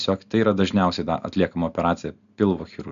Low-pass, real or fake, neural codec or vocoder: 7.2 kHz; real; none